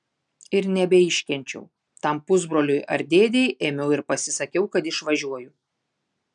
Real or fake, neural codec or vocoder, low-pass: real; none; 9.9 kHz